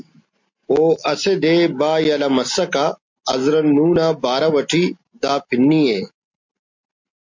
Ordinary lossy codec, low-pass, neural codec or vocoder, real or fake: MP3, 64 kbps; 7.2 kHz; none; real